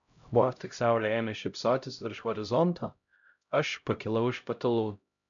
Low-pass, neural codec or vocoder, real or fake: 7.2 kHz; codec, 16 kHz, 0.5 kbps, X-Codec, HuBERT features, trained on LibriSpeech; fake